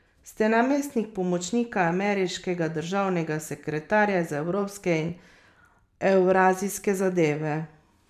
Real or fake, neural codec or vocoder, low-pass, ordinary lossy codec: fake; vocoder, 44.1 kHz, 128 mel bands every 512 samples, BigVGAN v2; 14.4 kHz; none